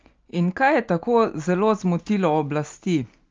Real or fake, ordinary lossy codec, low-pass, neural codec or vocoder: real; Opus, 24 kbps; 7.2 kHz; none